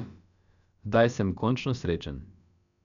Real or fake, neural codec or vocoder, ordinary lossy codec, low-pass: fake; codec, 16 kHz, about 1 kbps, DyCAST, with the encoder's durations; none; 7.2 kHz